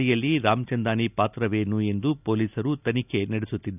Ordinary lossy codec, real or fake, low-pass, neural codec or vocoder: none; real; 3.6 kHz; none